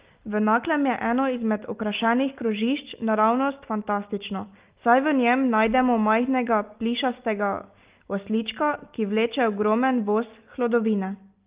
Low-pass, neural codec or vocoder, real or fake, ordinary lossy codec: 3.6 kHz; none; real; Opus, 32 kbps